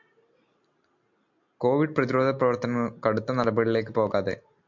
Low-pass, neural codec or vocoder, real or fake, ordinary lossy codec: 7.2 kHz; none; real; MP3, 64 kbps